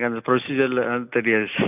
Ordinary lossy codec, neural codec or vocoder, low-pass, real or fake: none; none; 3.6 kHz; real